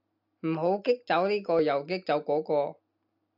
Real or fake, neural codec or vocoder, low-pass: real; none; 5.4 kHz